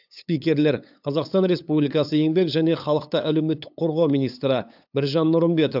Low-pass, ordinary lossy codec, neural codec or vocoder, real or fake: 5.4 kHz; AAC, 48 kbps; codec, 16 kHz, 8 kbps, FunCodec, trained on LibriTTS, 25 frames a second; fake